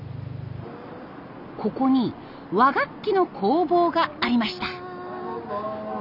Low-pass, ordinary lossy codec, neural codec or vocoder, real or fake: 5.4 kHz; none; none; real